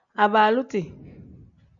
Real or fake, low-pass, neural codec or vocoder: real; 7.2 kHz; none